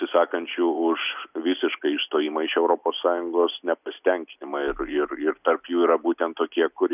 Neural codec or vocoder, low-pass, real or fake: none; 3.6 kHz; real